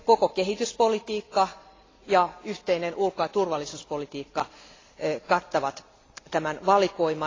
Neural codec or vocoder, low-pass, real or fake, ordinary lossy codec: none; 7.2 kHz; real; AAC, 32 kbps